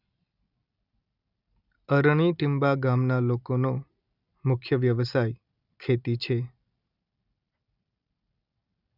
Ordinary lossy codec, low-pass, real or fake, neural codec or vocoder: none; 5.4 kHz; real; none